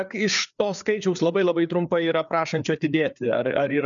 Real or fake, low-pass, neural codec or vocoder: fake; 7.2 kHz; codec, 16 kHz, 4 kbps, FreqCodec, larger model